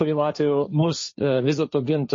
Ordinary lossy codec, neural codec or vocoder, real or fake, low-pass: MP3, 32 kbps; codec, 16 kHz, 1.1 kbps, Voila-Tokenizer; fake; 7.2 kHz